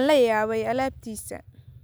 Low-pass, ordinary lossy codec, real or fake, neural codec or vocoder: none; none; real; none